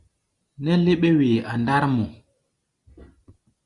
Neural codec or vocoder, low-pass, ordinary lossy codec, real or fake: none; 10.8 kHz; Opus, 64 kbps; real